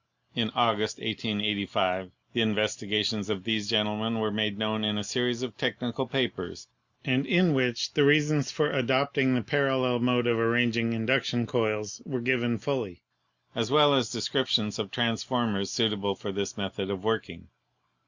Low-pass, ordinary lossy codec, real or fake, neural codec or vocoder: 7.2 kHz; Opus, 64 kbps; real; none